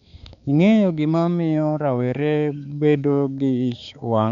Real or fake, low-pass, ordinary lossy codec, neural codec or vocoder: fake; 7.2 kHz; none; codec, 16 kHz, 4 kbps, X-Codec, HuBERT features, trained on balanced general audio